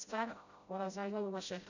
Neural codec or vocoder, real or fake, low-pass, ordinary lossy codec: codec, 16 kHz, 0.5 kbps, FreqCodec, smaller model; fake; 7.2 kHz; none